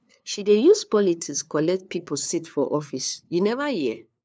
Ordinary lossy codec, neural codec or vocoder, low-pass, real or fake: none; codec, 16 kHz, 2 kbps, FunCodec, trained on LibriTTS, 25 frames a second; none; fake